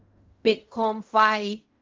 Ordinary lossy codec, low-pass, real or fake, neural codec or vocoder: Opus, 32 kbps; 7.2 kHz; fake; codec, 16 kHz in and 24 kHz out, 0.4 kbps, LongCat-Audio-Codec, fine tuned four codebook decoder